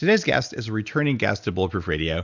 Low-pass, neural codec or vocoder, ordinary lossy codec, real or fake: 7.2 kHz; none; Opus, 64 kbps; real